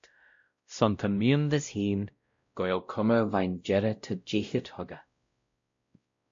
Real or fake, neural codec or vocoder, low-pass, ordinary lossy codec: fake; codec, 16 kHz, 0.5 kbps, X-Codec, WavLM features, trained on Multilingual LibriSpeech; 7.2 kHz; MP3, 48 kbps